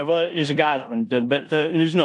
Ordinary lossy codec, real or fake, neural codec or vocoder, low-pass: AAC, 64 kbps; fake; codec, 16 kHz in and 24 kHz out, 0.9 kbps, LongCat-Audio-Codec, four codebook decoder; 10.8 kHz